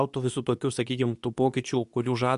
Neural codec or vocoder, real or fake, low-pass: codec, 24 kHz, 0.9 kbps, WavTokenizer, medium speech release version 2; fake; 10.8 kHz